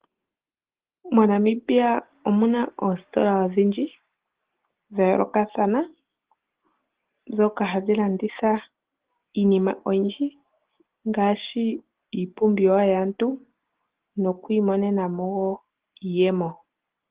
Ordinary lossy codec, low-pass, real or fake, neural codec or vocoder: Opus, 16 kbps; 3.6 kHz; real; none